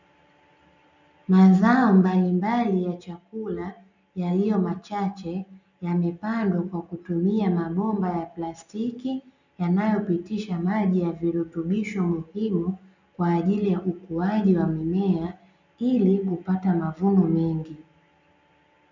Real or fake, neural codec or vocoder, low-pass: real; none; 7.2 kHz